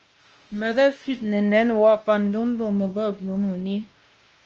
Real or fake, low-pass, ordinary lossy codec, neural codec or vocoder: fake; 7.2 kHz; Opus, 24 kbps; codec, 16 kHz, 1 kbps, X-Codec, WavLM features, trained on Multilingual LibriSpeech